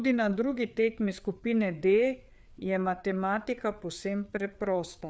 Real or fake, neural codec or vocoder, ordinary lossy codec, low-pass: fake; codec, 16 kHz, 4 kbps, FreqCodec, larger model; none; none